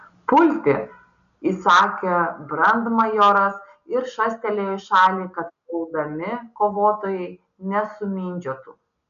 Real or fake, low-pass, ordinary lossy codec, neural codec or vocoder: real; 7.2 kHz; MP3, 96 kbps; none